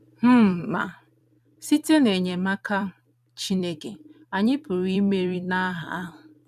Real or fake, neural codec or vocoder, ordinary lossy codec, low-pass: fake; vocoder, 44.1 kHz, 128 mel bands every 256 samples, BigVGAN v2; none; 14.4 kHz